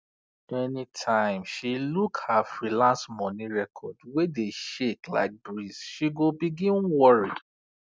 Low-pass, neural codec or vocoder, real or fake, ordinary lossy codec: none; none; real; none